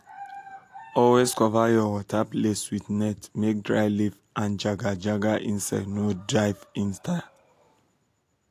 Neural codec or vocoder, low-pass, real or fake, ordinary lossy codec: none; 14.4 kHz; real; AAC, 64 kbps